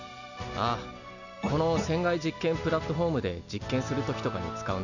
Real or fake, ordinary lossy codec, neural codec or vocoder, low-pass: real; none; none; 7.2 kHz